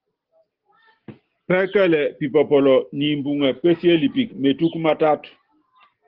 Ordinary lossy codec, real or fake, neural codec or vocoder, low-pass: Opus, 16 kbps; real; none; 5.4 kHz